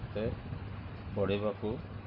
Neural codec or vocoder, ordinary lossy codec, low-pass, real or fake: none; none; 5.4 kHz; real